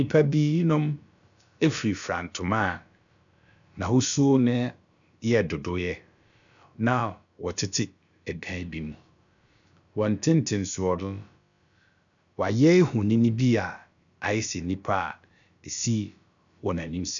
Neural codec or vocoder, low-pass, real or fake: codec, 16 kHz, about 1 kbps, DyCAST, with the encoder's durations; 7.2 kHz; fake